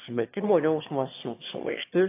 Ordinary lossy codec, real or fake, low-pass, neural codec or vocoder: AAC, 24 kbps; fake; 3.6 kHz; autoencoder, 22.05 kHz, a latent of 192 numbers a frame, VITS, trained on one speaker